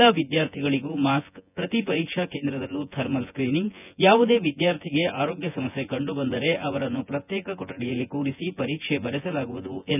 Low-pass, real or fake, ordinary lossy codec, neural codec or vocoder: 3.6 kHz; fake; none; vocoder, 24 kHz, 100 mel bands, Vocos